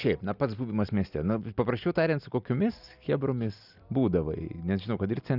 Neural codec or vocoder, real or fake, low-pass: none; real; 5.4 kHz